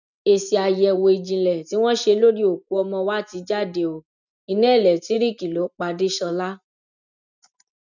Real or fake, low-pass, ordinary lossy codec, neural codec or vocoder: real; 7.2 kHz; none; none